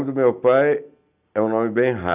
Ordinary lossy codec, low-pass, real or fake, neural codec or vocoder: none; 3.6 kHz; real; none